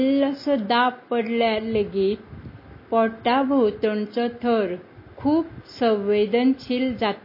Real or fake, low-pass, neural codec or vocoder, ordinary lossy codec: real; 5.4 kHz; none; MP3, 24 kbps